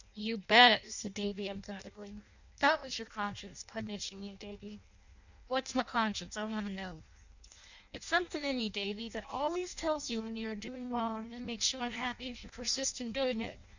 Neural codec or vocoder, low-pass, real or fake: codec, 16 kHz in and 24 kHz out, 0.6 kbps, FireRedTTS-2 codec; 7.2 kHz; fake